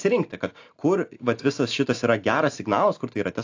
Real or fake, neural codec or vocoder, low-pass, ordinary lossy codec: real; none; 7.2 kHz; AAC, 48 kbps